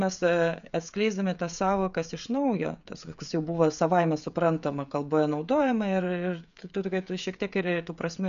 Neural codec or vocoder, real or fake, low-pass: codec, 16 kHz, 16 kbps, FreqCodec, smaller model; fake; 7.2 kHz